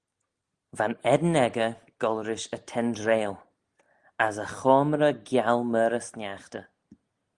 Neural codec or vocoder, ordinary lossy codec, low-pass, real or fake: none; Opus, 24 kbps; 10.8 kHz; real